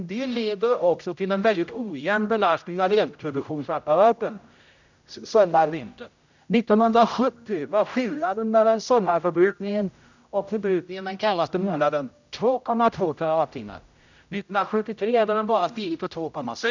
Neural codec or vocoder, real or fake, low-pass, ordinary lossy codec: codec, 16 kHz, 0.5 kbps, X-Codec, HuBERT features, trained on general audio; fake; 7.2 kHz; none